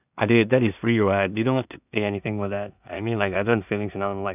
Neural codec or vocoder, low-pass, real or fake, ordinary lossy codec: codec, 16 kHz in and 24 kHz out, 0.4 kbps, LongCat-Audio-Codec, two codebook decoder; 3.6 kHz; fake; none